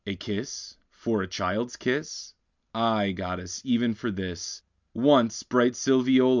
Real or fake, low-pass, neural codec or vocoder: real; 7.2 kHz; none